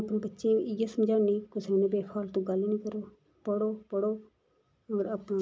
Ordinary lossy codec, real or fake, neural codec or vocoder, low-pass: none; real; none; none